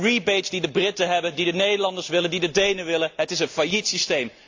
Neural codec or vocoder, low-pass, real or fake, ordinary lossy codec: none; 7.2 kHz; real; AAC, 48 kbps